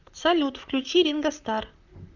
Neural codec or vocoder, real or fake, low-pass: vocoder, 44.1 kHz, 128 mel bands, Pupu-Vocoder; fake; 7.2 kHz